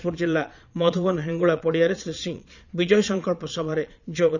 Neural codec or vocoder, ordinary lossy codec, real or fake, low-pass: vocoder, 22.05 kHz, 80 mel bands, Vocos; none; fake; 7.2 kHz